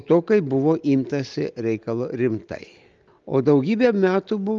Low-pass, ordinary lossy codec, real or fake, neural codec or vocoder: 7.2 kHz; Opus, 32 kbps; real; none